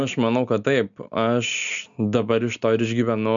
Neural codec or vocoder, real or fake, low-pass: none; real; 7.2 kHz